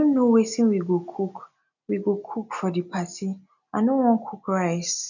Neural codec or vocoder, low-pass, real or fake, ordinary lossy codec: none; 7.2 kHz; real; none